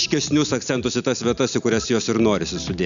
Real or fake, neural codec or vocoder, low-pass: real; none; 7.2 kHz